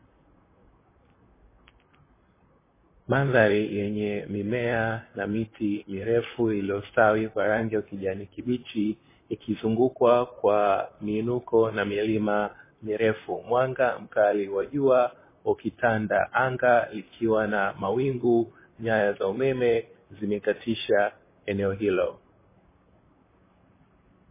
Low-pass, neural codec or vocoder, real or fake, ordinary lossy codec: 3.6 kHz; vocoder, 44.1 kHz, 128 mel bands, Pupu-Vocoder; fake; MP3, 16 kbps